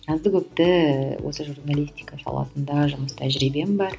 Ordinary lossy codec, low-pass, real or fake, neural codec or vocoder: none; none; real; none